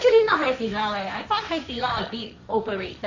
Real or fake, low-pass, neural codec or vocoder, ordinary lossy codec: fake; 7.2 kHz; codec, 16 kHz, 1.1 kbps, Voila-Tokenizer; none